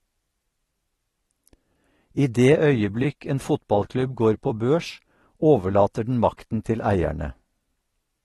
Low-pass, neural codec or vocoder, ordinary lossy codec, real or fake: 19.8 kHz; vocoder, 44.1 kHz, 128 mel bands every 256 samples, BigVGAN v2; AAC, 32 kbps; fake